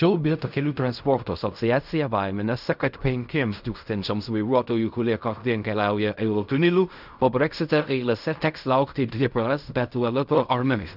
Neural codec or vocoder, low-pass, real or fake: codec, 16 kHz in and 24 kHz out, 0.4 kbps, LongCat-Audio-Codec, fine tuned four codebook decoder; 5.4 kHz; fake